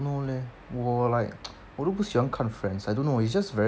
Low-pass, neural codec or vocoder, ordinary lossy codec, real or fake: none; none; none; real